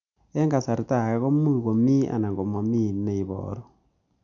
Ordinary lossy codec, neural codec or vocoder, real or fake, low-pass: none; none; real; 7.2 kHz